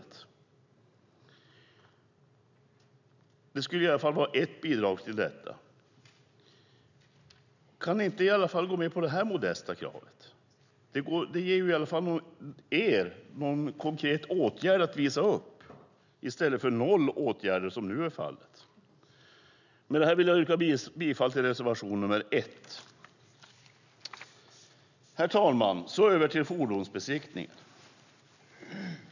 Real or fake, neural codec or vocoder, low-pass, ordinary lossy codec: real; none; 7.2 kHz; none